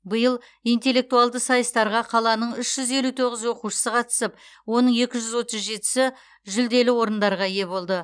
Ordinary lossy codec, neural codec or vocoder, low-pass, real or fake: none; none; 9.9 kHz; real